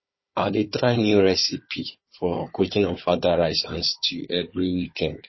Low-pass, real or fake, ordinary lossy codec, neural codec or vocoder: 7.2 kHz; fake; MP3, 24 kbps; codec, 16 kHz, 4 kbps, FunCodec, trained on Chinese and English, 50 frames a second